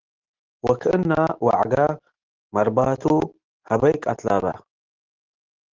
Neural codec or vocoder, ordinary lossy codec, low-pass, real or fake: none; Opus, 16 kbps; 7.2 kHz; real